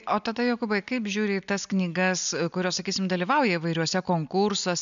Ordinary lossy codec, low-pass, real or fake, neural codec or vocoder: AAC, 96 kbps; 7.2 kHz; real; none